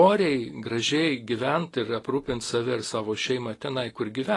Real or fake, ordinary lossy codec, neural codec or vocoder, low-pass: real; AAC, 32 kbps; none; 10.8 kHz